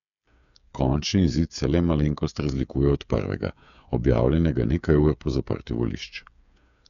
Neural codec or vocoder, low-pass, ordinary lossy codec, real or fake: codec, 16 kHz, 8 kbps, FreqCodec, smaller model; 7.2 kHz; none; fake